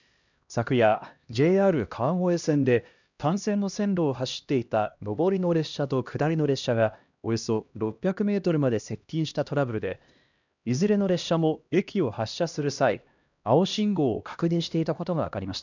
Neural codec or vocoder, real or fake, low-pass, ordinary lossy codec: codec, 16 kHz, 1 kbps, X-Codec, HuBERT features, trained on LibriSpeech; fake; 7.2 kHz; none